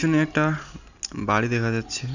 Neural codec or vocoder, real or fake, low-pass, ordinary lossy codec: none; real; 7.2 kHz; none